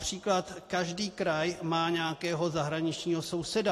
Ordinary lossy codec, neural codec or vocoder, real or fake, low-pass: AAC, 48 kbps; none; real; 14.4 kHz